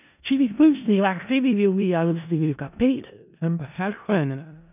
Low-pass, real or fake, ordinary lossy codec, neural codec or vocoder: 3.6 kHz; fake; none; codec, 16 kHz in and 24 kHz out, 0.4 kbps, LongCat-Audio-Codec, four codebook decoder